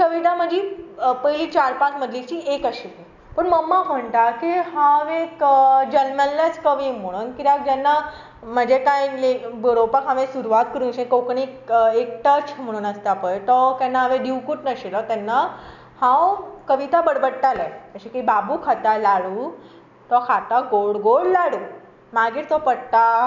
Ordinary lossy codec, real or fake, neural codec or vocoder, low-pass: none; real; none; 7.2 kHz